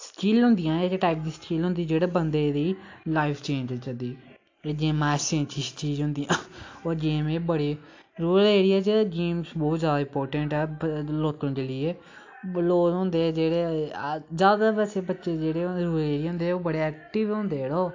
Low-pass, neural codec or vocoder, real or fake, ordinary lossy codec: 7.2 kHz; none; real; AAC, 48 kbps